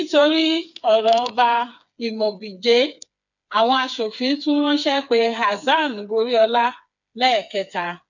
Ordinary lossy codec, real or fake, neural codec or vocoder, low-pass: none; fake; codec, 16 kHz, 4 kbps, FreqCodec, smaller model; 7.2 kHz